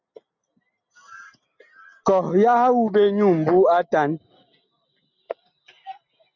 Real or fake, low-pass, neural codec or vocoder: real; 7.2 kHz; none